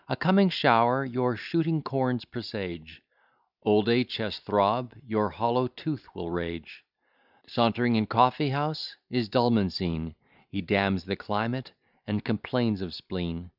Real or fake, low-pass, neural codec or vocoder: real; 5.4 kHz; none